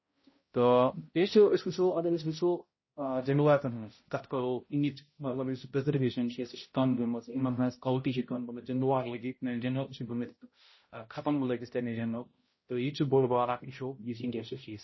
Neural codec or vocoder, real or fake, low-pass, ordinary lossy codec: codec, 16 kHz, 0.5 kbps, X-Codec, HuBERT features, trained on balanced general audio; fake; 7.2 kHz; MP3, 24 kbps